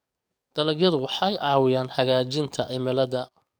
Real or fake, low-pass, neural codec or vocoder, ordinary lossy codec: fake; none; codec, 44.1 kHz, 7.8 kbps, DAC; none